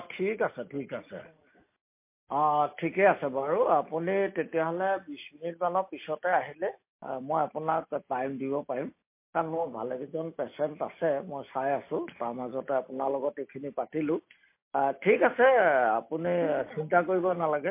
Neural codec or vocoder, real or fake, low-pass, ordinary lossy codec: none; real; 3.6 kHz; MP3, 24 kbps